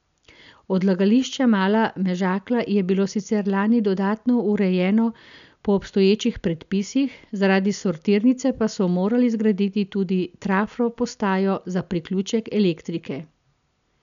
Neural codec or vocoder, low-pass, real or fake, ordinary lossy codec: none; 7.2 kHz; real; none